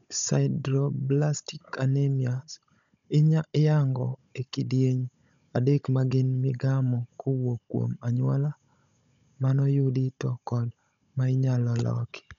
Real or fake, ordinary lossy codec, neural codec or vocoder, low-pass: fake; none; codec, 16 kHz, 16 kbps, FunCodec, trained on Chinese and English, 50 frames a second; 7.2 kHz